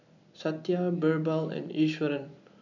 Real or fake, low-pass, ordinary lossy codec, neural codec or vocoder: real; 7.2 kHz; none; none